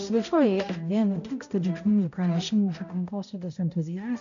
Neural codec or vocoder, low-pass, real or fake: codec, 16 kHz, 0.5 kbps, X-Codec, HuBERT features, trained on balanced general audio; 7.2 kHz; fake